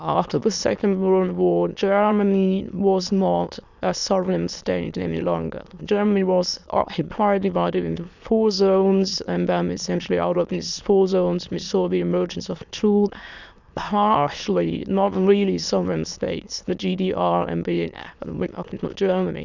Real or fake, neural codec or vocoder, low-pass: fake; autoencoder, 22.05 kHz, a latent of 192 numbers a frame, VITS, trained on many speakers; 7.2 kHz